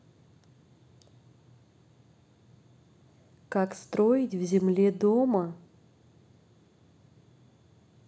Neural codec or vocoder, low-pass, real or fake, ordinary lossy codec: none; none; real; none